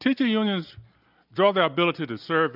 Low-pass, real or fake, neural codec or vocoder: 5.4 kHz; real; none